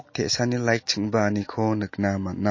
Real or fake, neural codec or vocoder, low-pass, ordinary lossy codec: real; none; 7.2 kHz; MP3, 32 kbps